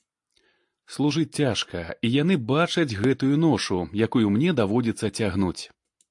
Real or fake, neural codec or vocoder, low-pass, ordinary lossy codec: real; none; 10.8 kHz; AAC, 64 kbps